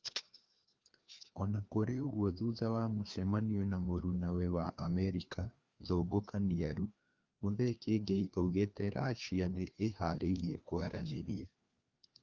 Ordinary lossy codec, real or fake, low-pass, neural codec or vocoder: Opus, 32 kbps; fake; 7.2 kHz; codec, 16 kHz, 2 kbps, FreqCodec, larger model